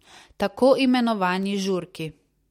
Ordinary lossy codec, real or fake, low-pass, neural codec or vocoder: MP3, 64 kbps; real; 19.8 kHz; none